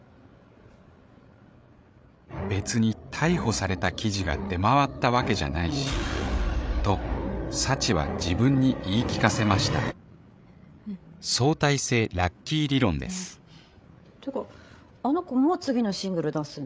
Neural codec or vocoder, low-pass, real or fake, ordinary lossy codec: codec, 16 kHz, 8 kbps, FreqCodec, larger model; none; fake; none